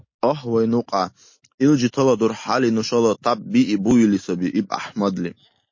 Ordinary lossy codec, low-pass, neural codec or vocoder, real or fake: MP3, 32 kbps; 7.2 kHz; none; real